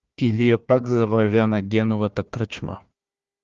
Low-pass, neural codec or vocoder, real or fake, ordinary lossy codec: 7.2 kHz; codec, 16 kHz, 1 kbps, FunCodec, trained on Chinese and English, 50 frames a second; fake; Opus, 32 kbps